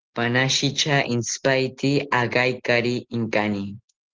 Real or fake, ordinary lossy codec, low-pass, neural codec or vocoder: real; Opus, 16 kbps; 7.2 kHz; none